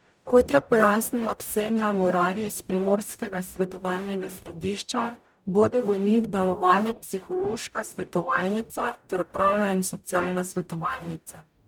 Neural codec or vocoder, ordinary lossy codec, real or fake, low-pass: codec, 44.1 kHz, 0.9 kbps, DAC; none; fake; none